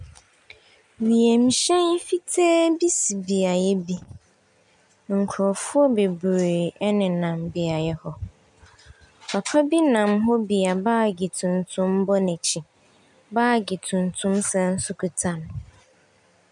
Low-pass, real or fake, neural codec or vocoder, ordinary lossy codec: 10.8 kHz; real; none; MP3, 96 kbps